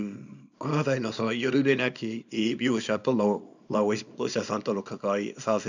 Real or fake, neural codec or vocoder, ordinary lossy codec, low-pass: fake; codec, 24 kHz, 0.9 kbps, WavTokenizer, small release; none; 7.2 kHz